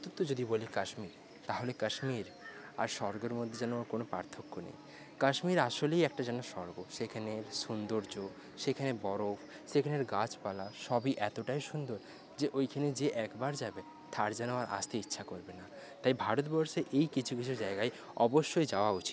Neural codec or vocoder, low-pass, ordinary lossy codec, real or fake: none; none; none; real